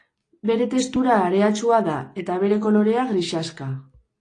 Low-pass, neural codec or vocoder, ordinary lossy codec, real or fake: 9.9 kHz; none; AAC, 32 kbps; real